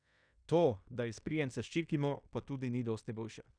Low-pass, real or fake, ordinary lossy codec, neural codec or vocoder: 9.9 kHz; fake; none; codec, 16 kHz in and 24 kHz out, 0.9 kbps, LongCat-Audio-Codec, fine tuned four codebook decoder